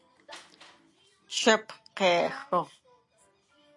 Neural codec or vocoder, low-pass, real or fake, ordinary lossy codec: none; 10.8 kHz; real; AAC, 48 kbps